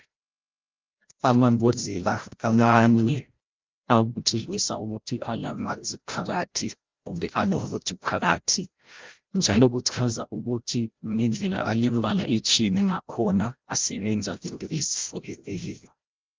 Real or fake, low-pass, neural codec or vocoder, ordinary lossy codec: fake; 7.2 kHz; codec, 16 kHz, 0.5 kbps, FreqCodec, larger model; Opus, 24 kbps